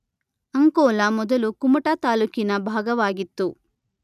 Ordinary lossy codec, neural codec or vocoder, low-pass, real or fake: none; none; 14.4 kHz; real